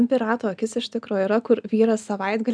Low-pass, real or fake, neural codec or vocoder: 9.9 kHz; real; none